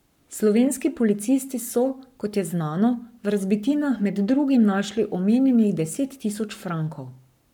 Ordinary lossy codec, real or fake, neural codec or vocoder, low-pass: none; fake; codec, 44.1 kHz, 7.8 kbps, Pupu-Codec; 19.8 kHz